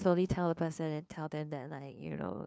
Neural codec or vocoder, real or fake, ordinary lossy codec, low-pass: codec, 16 kHz, 2 kbps, FunCodec, trained on LibriTTS, 25 frames a second; fake; none; none